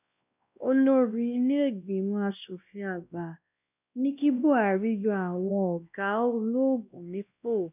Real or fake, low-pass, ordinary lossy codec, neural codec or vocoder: fake; 3.6 kHz; none; codec, 16 kHz, 1 kbps, X-Codec, WavLM features, trained on Multilingual LibriSpeech